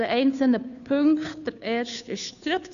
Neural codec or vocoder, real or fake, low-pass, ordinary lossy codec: codec, 16 kHz, 2 kbps, FunCodec, trained on Chinese and English, 25 frames a second; fake; 7.2 kHz; none